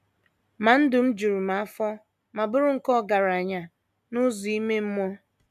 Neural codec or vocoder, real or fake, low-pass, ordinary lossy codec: none; real; 14.4 kHz; none